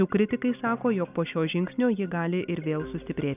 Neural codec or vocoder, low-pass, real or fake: none; 3.6 kHz; real